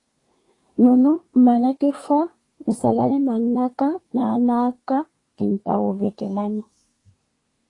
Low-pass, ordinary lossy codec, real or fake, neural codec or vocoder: 10.8 kHz; AAC, 32 kbps; fake; codec, 24 kHz, 1 kbps, SNAC